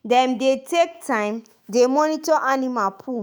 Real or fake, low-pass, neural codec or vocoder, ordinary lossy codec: fake; none; autoencoder, 48 kHz, 128 numbers a frame, DAC-VAE, trained on Japanese speech; none